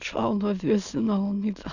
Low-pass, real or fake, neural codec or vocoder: 7.2 kHz; fake; autoencoder, 22.05 kHz, a latent of 192 numbers a frame, VITS, trained on many speakers